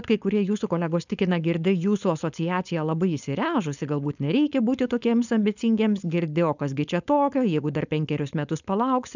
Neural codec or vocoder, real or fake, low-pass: codec, 16 kHz, 4.8 kbps, FACodec; fake; 7.2 kHz